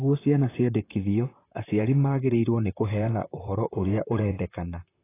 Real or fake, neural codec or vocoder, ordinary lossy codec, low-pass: fake; vocoder, 44.1 kHz, 128 mel bands, Pupu-Vocoder; AAC, 16 kbps; 3.6 kHz